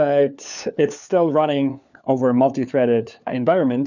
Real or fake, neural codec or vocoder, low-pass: fake; codec, 16 kHz, 4 kbps, FunCodec, trained on LibriTTS, 50 frames a second; 7.2 kHz